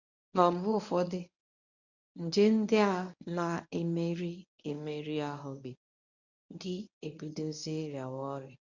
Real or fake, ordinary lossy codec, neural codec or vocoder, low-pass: fake; none; codec, 24 kHz, 0.9 kbps, WavTokenizer, medium speech release version 1; 7.2 kHz